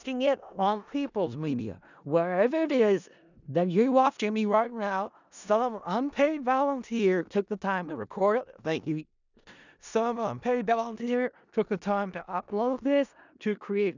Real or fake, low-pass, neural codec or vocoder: fake; 7.2 kHz; codec, 16 kHz in and 24 kHz out, 0.4 kbps, LongCat-Audio-Codec, four codebook decoder